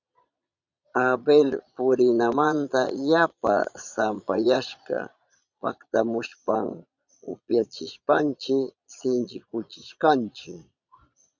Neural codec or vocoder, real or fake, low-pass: vocoder, 22.05 kHz, 80 mel bands, Vocos; fake; 7.2 kHz